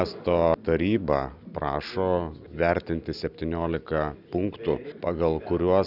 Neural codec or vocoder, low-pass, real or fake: none; 5.4 kHz; real